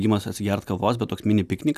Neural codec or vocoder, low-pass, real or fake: none; 14.4 kHz; real